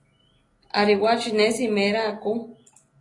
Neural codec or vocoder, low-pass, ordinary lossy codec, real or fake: none; 10.8 kHz; AAC, 32 kbps; real